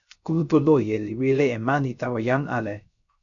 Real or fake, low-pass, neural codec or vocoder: fake; 7.2 kHz; codec, 16 kHz, 0.3 kbps, FocalCodec